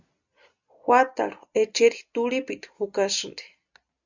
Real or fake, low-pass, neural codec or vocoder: real; 7.2 kHz; none